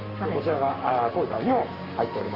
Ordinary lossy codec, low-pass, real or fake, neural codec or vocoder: Opus, 32 kbps; 5.4 kHz; fake; codec, 44.1 kHz, 7.8 kbps, Pupu-Codec